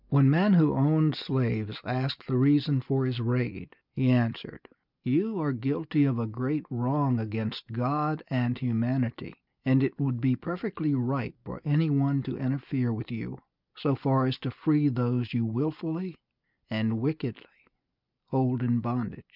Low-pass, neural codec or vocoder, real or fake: 5.4 kHz; none; real